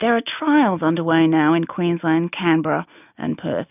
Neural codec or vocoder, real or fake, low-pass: none; real; 3.6 kHz